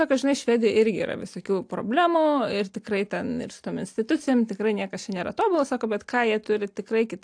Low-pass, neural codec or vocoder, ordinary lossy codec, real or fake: 9.9 kHz; none; AAC, 64 kbps; real